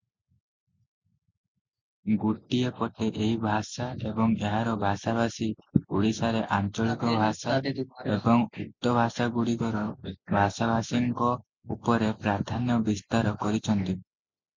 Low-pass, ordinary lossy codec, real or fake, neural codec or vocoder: 7.2 kHz; MP3, 64 kbps; real; none